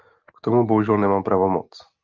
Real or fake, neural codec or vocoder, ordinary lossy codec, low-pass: real; none; Opus, 32 kbps; 7.2 kHz